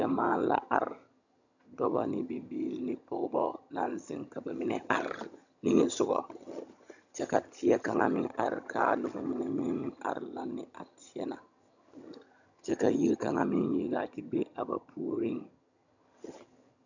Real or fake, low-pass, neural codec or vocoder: fake; 7.2 kHz; vocoder, 22.05 kHz, 80 mel bands, HiFi-GAN